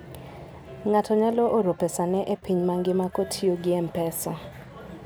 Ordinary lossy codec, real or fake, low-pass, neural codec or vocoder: none; real; none; none